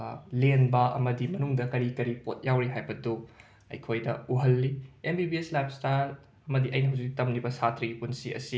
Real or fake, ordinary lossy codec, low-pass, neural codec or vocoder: real; none; none; none